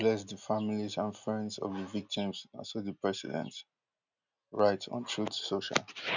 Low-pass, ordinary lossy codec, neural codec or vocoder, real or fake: 7.2 kHz; none; none; real